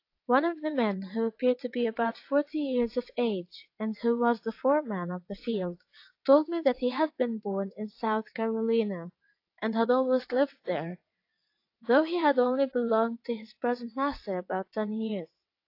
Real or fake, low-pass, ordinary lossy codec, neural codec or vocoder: fake; 5.4 kHz; AAC, 32 kbps; vocoder, 22.05 kHz, 80 mel bands, WaveNeXt